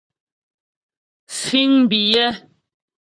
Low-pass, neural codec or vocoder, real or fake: 9.9 kHz; vocoder, 44.1 kHz, 128 mel bands, Pupu-Vocoder; fake